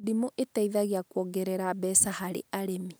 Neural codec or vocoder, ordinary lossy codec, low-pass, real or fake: none; none; none; real